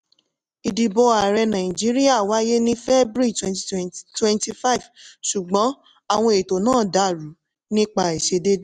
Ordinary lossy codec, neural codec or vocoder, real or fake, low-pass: none; none; real; none